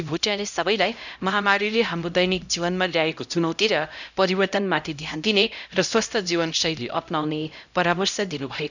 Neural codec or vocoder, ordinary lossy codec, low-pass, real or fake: codec, 16 kHz, 0.5 kbps, X-Codec, HuBERT features, trained on LibriSpeech; none; 7.2 kHz; fake